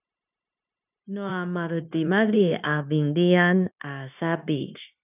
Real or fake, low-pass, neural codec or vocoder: fake; 3.6 kHz; codec, 16 kHz, 0.9 kbps, LongCat-Audio-Codec